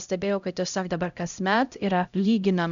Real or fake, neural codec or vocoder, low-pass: fake; codec, 16 kHz, 0.5 kbps, X-Codec, HuBERT features, trained on LibriSpeech; 7.2 kHz